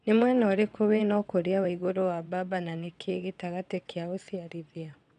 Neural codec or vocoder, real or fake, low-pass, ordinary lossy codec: vocoder, 22.05 kHz, 80 mel bands, WaveNeXt; fake; 9.9 kHz; none